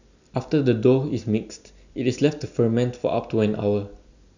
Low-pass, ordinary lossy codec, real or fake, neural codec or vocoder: 7.2 kHz; none; real; none